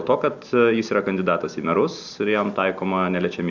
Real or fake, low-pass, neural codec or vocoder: real; 7.2 kHz; none